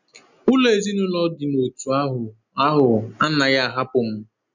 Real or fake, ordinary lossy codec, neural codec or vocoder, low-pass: real; none; none; 7.2 kHz